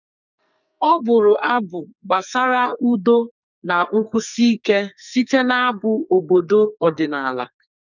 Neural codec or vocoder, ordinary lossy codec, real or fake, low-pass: codec, 44.1 kHz, 2.6 kbps, SNAC; none; fake; 7.2 kHz